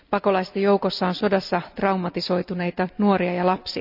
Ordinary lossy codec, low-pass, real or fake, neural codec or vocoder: none; 5.4 kHz; real; none